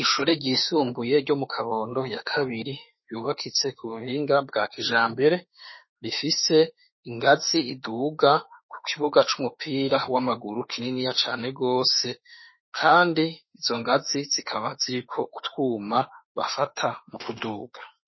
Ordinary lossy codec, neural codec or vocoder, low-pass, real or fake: MP3, 24 kbps; autoencoder, 48 kHz, 32 numbers a frame, DAC-VAE, trained on Japanese speech; 7.2 kHz; fake